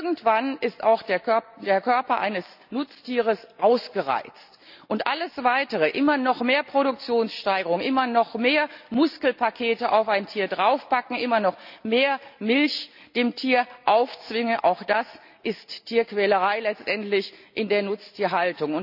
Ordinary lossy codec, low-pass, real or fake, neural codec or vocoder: none; 5.4 kHz; real; none